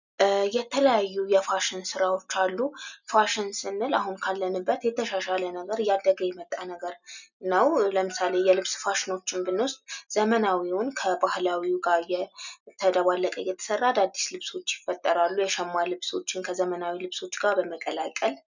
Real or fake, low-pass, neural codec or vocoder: real; 7.2 kHz; none